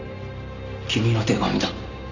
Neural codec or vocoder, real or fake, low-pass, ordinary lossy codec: none; real; 7.2 kHz; none